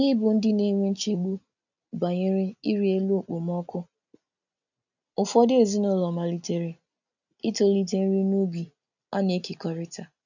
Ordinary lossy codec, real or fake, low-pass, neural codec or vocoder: none; real; 7.2 kHz; none